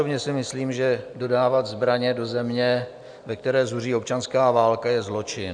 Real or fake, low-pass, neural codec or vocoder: real; 9.9 kHz; none